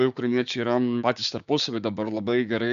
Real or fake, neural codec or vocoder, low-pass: fake; codec, 16 kHz, 6 kbps, DAC; 7.2 kHz